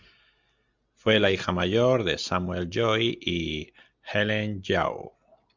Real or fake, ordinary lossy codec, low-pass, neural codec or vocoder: real; MP3, 64 kbps; 7.2 kHz; none